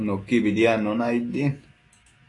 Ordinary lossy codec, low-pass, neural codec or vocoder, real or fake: AAC, 32 kbps; 10.8 kHz; autoencoder, 48 kHz, 128 numbers a frame, DAC-VAE, trained on Japanese speech; fake